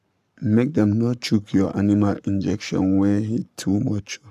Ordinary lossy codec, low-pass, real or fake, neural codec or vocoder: none; 14.4 kHz; fake; codec, 44.1 kHz, 7.8 kbps, Pupu-Codec